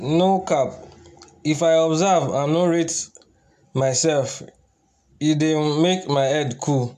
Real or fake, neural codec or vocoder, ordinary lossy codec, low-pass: real; none; AAC, 96 kbps; 14.4 kHz